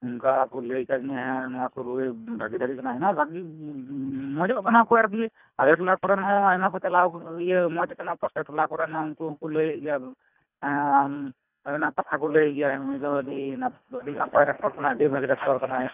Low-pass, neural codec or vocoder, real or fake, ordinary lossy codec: 3.6 kHz; codec, 24 kHz, 1.5 kbps, HILCodec; fake; none